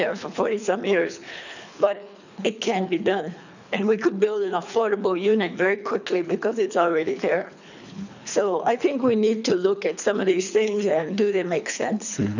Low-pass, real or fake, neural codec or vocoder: 7.2 kHz; fake; codec, 24 kHz, 3 kbps, HILCodec